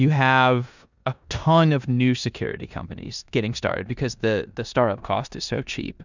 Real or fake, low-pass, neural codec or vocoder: fake; 7.2 kHz; codec, 16 kHz in and 24 kHz out, 0.9 kbps, LongCat-Audio-Codec, four codebook decoder